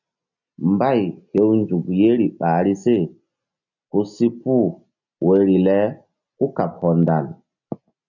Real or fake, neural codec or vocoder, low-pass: real; none; 7.2 kHz